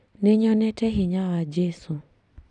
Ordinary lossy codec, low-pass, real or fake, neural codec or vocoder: none; 10.8 kHz; fake; vocoder, 44.1 kHz, 128 mel bands every 256 samples, BigVGAN v2